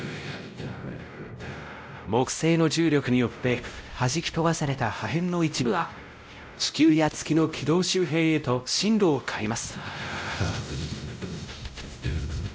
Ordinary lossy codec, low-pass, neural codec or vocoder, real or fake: none; none; codec, 16 kHz, 0.5 kbps, X-Codec, WavLM features, trained on Multilingual LibriSpeech; fake